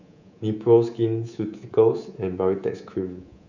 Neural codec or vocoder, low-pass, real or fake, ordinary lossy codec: codec, 24 kHz, 3.1 kbps, DualCodec; 7.2 kHz; fake; none